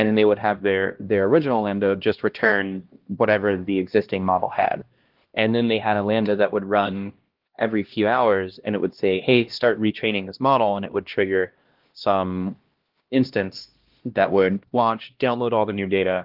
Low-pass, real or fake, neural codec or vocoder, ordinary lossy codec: 5.4 kHz; fake; codec, 16 kHz, 1 kbps, X-Codec, HuBERT features, trained on LibriSpeech; Opus, 16 kbps